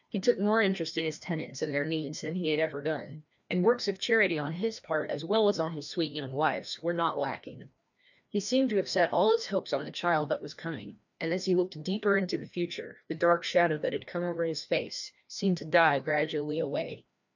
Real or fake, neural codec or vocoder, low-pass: fake; codec, 16 kHz, 1 kbps, FreqCodec, larger model; 7.2 kHz